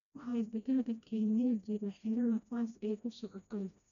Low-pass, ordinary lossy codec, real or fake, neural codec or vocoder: 7.2 kHz; none; fake; codec, 16 kHz, 1 kbps, FreqCodec, smaller model